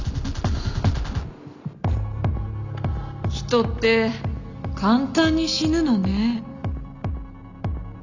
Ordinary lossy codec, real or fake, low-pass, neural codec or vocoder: none; real; 7.2 kHz; none